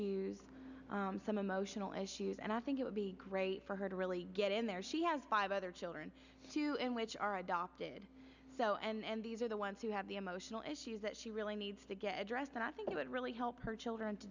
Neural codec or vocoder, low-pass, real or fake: none; 7.2 kHz; real